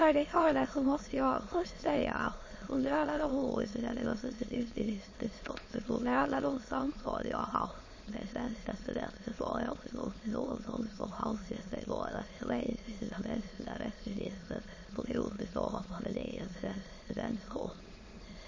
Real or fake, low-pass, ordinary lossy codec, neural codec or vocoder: fake; 7.2 kHz; MP3, 32 kbps; autoencoder, 22.05 kHz, a latent of 192 numbers a frame, VITS, trained on many speakers